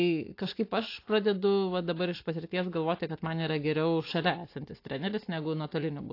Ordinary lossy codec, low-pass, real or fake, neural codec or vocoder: AAC, 32 kbps; 5.4 kHz; real; none